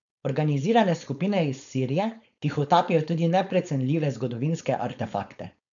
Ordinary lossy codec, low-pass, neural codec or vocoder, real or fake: none; 7.2 kHz; codec, 16 kHz, 4.8 kbps, FACodec; fake